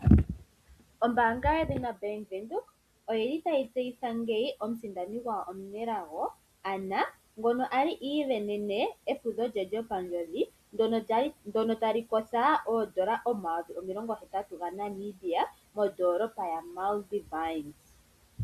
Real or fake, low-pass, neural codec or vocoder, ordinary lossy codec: real; 14.4 kHz; none; Opus, 64 kbps